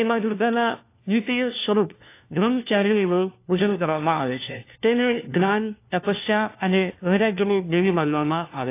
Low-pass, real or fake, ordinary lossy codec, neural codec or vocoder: 3.6 kHz; fake; AAC, 24 kbps; codec, 16 kHz, 1 kbps, FunCodec, trained on LibriTTS, 50 frames a second